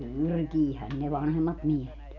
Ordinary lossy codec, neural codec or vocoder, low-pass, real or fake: none; none; 7.2 kHz; real